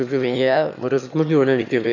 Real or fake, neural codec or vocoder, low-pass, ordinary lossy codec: fake; autoencoder, 22.05 kHz, a latent of 192 numbers a frame, VITS, trained on one speaker; 7.2 kHz; none